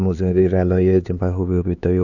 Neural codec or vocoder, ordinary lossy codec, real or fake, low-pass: codec, 16 kHz, 4 kbps, X-Codec, HuBERT features, trained on LibriSpeech; Opus, 64 kbps; fake; 7.2 kHz